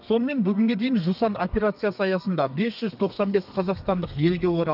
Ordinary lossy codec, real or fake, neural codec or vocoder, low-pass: none; fake; codec, 32 kHz, 1.9 kbps, SNAC; 5.4 kHz